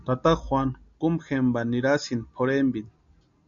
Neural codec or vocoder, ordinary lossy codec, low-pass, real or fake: none; AAC, 64 kbps; 7.2 kHz; real